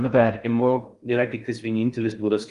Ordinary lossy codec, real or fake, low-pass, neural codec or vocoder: Opus, 32 kbps; fake; 10.8 kHz; codec, 16 kHz in and 24 kHz out, 0.6 kbps, FocalCodec, streaming, 4096 codes